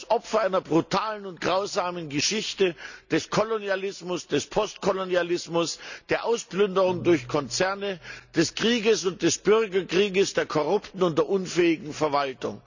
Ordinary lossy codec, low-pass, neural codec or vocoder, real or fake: none; 7.2 kHz; none; real